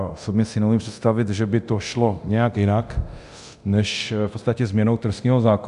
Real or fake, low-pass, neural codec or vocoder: fake; 10.8 kHz; codec, 24 kHz, 0.9 kbps, DualCodec